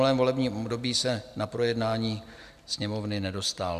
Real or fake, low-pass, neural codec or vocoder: real; 14.4 kHz; none